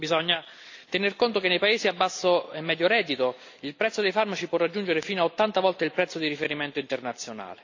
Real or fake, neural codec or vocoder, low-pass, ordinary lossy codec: real; none; 7.2 kHz; AAC, 48 kbps